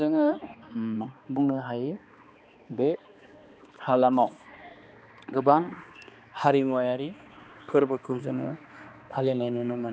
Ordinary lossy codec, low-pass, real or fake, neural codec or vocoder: none; none; fake; codec, 16 kHz, 2 kbps, X-Codec, HuBERT features, trained on balanced general audio